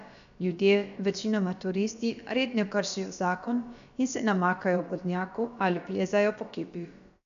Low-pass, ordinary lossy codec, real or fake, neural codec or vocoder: 7.2 kHz; none; fake; codec, 16 kHz, about 1 kbps, DyCAST, with the encoder's durations